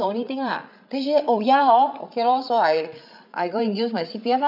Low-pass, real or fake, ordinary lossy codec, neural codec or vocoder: 5.4 kHz; fake; none; codec, 16 kHz, 8 kbps, FreqCodec, larger model